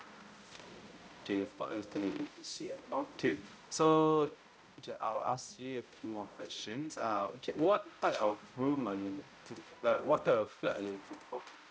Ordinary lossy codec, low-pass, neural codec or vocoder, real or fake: none; none; codec, 16 kHz, 0.5 kbps, X-Codec, HuBERT features, trained on balanced general audio; fake